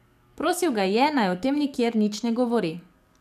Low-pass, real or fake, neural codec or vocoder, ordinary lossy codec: 14.4 kHz; fake; codec, 44.1 kHz, 7.8 kbps, DAC; none